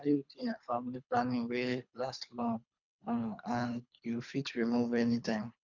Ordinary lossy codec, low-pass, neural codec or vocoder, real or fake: MP3, 64 kbps; 7.2 kHz; codec, 24 kHz, 3 kbps, HILCodec; fake